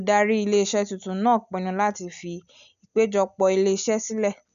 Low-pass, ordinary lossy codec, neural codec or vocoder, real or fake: 7.2 kHz; none; none; real